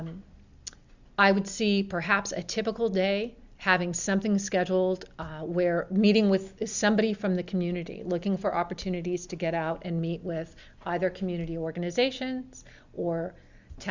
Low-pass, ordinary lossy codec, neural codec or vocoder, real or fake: 7.2 kHz; Opus, 64 kbps; none; real